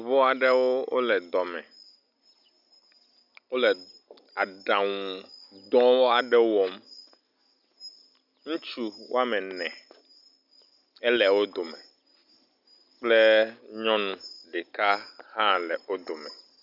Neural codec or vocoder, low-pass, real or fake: none; 5.4 kHz; real